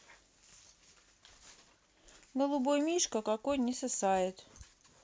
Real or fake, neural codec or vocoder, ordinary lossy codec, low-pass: real; none; none; none